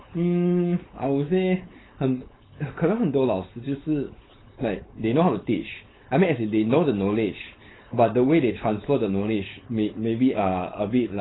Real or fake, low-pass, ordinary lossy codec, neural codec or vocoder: fake; 7.2 kHz; AAC, 16 kbps; codec, 16 kHz, 4.8 kbps, FACodec